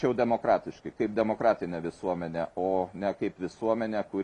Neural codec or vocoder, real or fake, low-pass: none; real; 10.8 kHz